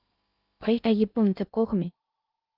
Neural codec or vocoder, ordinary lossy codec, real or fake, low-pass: codec, 16 kHz in and 24 kHz out, 0.6 kbps, FocalCodec, streaming, 2048 codes; Opus, 32 kbps; fake; 5.4 kHz